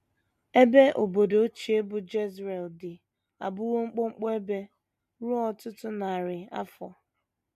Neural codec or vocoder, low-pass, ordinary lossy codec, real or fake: none; 14.4 kHz; MP3, 64 kbps; real